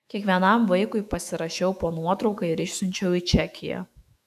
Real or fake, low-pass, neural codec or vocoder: fake; 14.4 kHz; autoencoder, 48 kHz, 128 numbers a frame, DAC-VAE, trained on Japanese speech